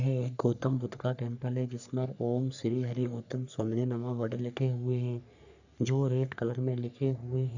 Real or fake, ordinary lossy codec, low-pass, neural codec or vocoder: fake; none; 7.2 kHz; codec, 44.1 kHz, 3.4 kbps, Pupu-Codec